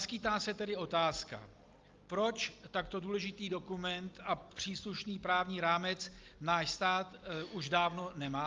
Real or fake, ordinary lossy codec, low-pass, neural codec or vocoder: real; Opus, 32 kbps; 7.2 kHz; none